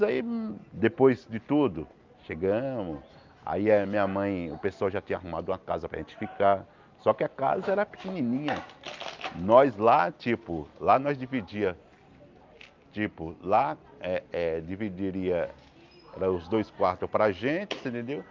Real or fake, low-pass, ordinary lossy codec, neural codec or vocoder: real; 7.2 kHz; Opus, 24 kbps; none